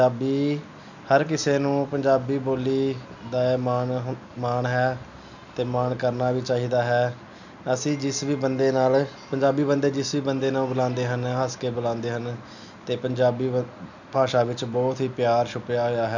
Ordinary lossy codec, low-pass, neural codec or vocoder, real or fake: none; 7.2 kHz; none; real